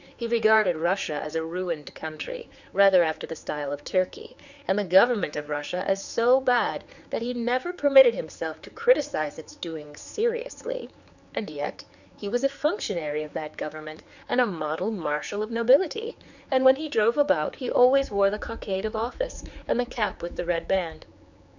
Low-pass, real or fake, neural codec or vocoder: 7.2 kHz; fake; codec, 16 kHz, 4 kbps, X-Codec, HuBERT features, trained on general audio